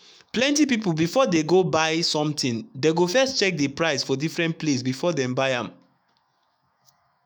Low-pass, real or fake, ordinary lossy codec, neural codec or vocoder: none; fake; none; autoencoder, 48 kHz, 128 numbers a frame, DAC-VAE, trained on Japanese speech